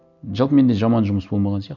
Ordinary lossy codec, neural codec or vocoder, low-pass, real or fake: none; none; 7.2 kHz; real